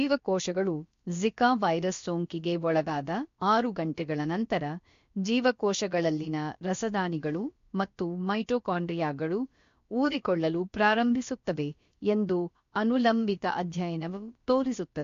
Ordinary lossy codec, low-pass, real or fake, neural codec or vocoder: MP3, 48 kbps; 7.2 kHz; fake; codec, 16 kHz, about 1 kbps, DyCAST, with the encoder's durations